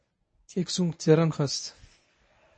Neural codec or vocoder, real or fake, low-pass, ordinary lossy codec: codec, 24 kHz, 0.9 kbps, WavTokenizer, medium speech release version 1; fake; 9.9 kHz; MP3, 32 kbps